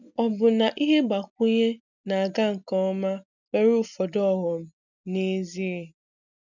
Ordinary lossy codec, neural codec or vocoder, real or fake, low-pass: none; none; real; 7.2 kHz